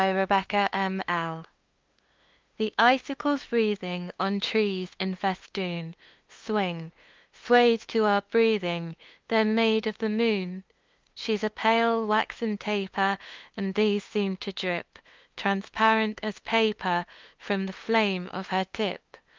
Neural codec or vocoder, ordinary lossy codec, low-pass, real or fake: codec, 16 kHz, 2 kbps, FunCodec, trained on LibriTTS, 25 frames a second; Opus, 24 kbps; 7.2 kHz; fake